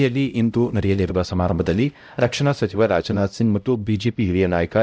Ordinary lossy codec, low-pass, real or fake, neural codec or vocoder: none; none; fake; codec, 16 kHz, 0.5 kbps, X-Codec, HuBERT features, trained on LibriSpeech